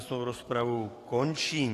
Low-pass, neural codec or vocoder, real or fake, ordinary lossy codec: 14.4 kHz; codec, 44.1 kHz, 7.8 kbps, DAC; fake; AAC, 48 kbps